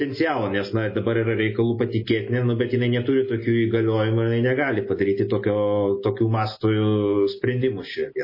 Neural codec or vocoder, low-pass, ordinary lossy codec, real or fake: none; 5.4 kHz; MP3, 24 kbps; real